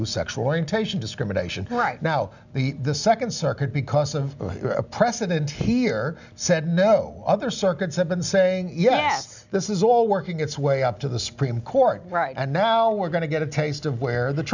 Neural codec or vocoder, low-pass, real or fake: none; 7.2 kHz; real